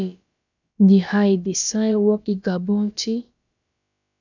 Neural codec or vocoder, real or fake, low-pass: codec, 16 kHz, about 1 kbps, DyCAST, with the encoder's durations; fake; 7.2 kHz